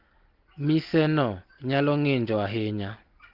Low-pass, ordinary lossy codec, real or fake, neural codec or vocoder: 5.4 kHz; Opus, 16 kbps; real; none